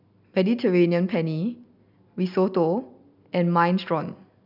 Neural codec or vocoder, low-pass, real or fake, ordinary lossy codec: none; 5.4 kHz; real; none